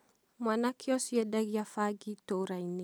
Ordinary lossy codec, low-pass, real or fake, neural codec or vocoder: none; none; real; none